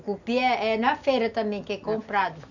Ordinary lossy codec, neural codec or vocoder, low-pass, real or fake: none; none; 7.2 kHz; real